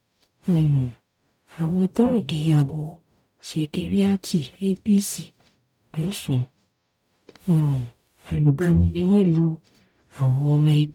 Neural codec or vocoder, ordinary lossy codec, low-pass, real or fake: codec, 44.1 kHz, 0.9 kbps, DAC; none; 19.8 kHz; fake